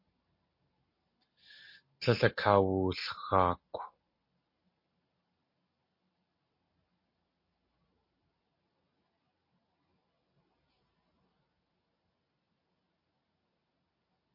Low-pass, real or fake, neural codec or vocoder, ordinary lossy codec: 5.4 kHz; real; none; MP3, 32 kbps